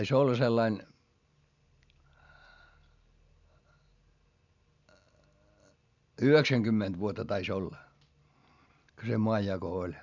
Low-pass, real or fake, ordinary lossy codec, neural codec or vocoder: 7.2 kHz; real; none; none